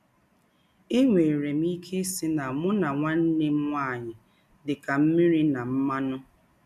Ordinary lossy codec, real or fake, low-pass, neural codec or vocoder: none; real; 14.4 kHz; none